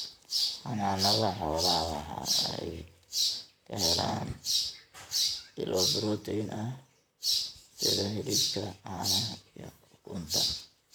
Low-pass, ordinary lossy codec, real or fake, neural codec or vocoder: none; none; fake; vocoder, 44.1 kHz, 128 mel bands, Pupu-Vocoder